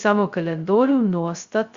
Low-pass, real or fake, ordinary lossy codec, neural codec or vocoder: 7.2 kHz; fake; Opus, 64 kbps; codec, 16 kHz, 0.2 kbps, FocalCodec